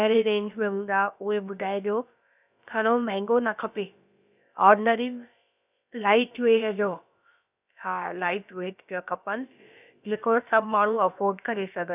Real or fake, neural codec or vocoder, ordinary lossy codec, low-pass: fake; codec, 16 kHz, about 1 kbps, DyCAST, with the encoder's durations; none; 3.6 kHz